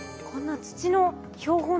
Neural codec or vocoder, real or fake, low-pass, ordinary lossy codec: none; real; none; none